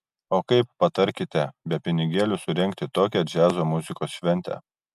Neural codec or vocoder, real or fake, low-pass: none; real; 14.4 kHz